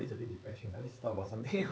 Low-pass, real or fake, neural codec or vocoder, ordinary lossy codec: none; fake; codec, 16 kHz, 4 kbps, X-Codec, HuBERT features, trained on LibriSpeech; none